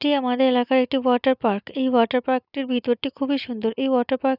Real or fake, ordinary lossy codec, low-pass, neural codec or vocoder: real; none; 5.4 kHz; none